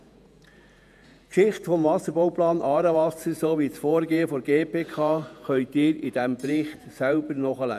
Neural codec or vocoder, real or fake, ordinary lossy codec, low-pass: vocoder, 48 kHz, 128 mel bands, Vocos; fake; none; 14.4 kHz